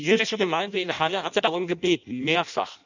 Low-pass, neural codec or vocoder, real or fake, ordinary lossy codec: 7.2 kHz; codec, 16 kHz in and 24 kHz out, 0.6 kbps, FireRedTTS-2 codec; fake; none